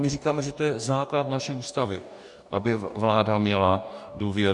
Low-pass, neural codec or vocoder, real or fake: 10.8 kHz; codec, 44.1 kHz, 2.6 kbps, DAC; fake